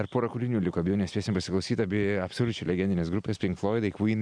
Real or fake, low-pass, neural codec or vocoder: real; 9.9 kHz; none